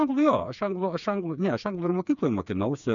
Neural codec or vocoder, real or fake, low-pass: codec, 16 kHz, 4 kbps, FreqCodec, smaller model; fake; 7.2 kHz